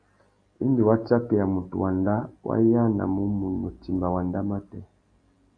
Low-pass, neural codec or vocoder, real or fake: 9.9 kHz; none; real